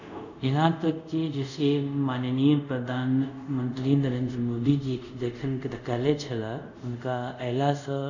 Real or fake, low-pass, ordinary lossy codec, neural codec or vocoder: fake; 7.2 kHz; none; codec, 24 kHz, 0.5 kbps, DualCodec